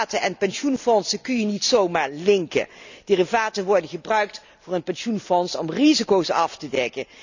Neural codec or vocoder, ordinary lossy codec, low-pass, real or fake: none; none; 7.2 kHz; real